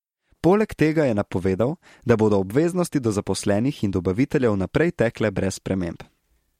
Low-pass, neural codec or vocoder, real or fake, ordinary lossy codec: 19.8 kHz; none; real; MP3, 64 kbps